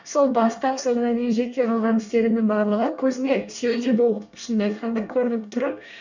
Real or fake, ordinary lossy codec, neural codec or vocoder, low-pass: fake; none; codec, 24 kHz, 1 kbps, SNAC; 7.2 kHz